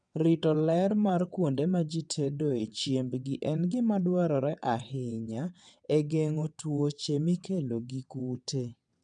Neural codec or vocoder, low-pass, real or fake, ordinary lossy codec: vocoder, 22.05 kHz, 80 mel bands, WaveNeXt; 9.9 kHz; fake; none